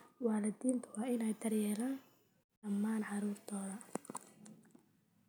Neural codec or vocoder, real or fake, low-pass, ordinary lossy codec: none; real; none; none